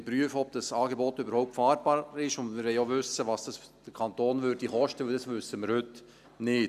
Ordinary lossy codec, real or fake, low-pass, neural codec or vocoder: none; real; 14.4 kHz; none